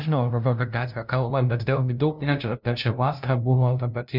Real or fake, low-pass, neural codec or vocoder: fake; 5.4 kHz; codec, 16 kHz, 0.5 kbps, FunCodec, trained on LibriTTS, 25 frames a second